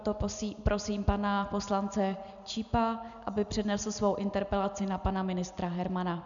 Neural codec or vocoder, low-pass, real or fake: none; 7.2 kHz; real